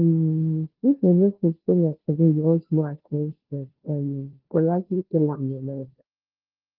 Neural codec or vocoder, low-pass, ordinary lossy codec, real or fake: codec, 16 kHz, 0.5 kbps, FunCodec, trained on Chinese and English, 25 frames a second; 5.4 kHz; Opus, 16 kbps; fake